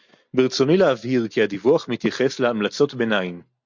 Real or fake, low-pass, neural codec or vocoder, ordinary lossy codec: real; 7.2 kHz; none; MP3, 48 kbps